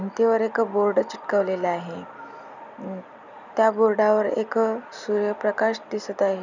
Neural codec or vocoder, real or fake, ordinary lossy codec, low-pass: none; real; none; 7.2 kHz